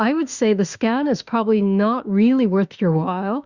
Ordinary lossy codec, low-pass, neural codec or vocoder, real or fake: Opus, 64 kbps; 7.2 kHz; autoencoder, 48 kHz, 32 numbers a frame, DAC-VAE, trained on Japanese speech; fake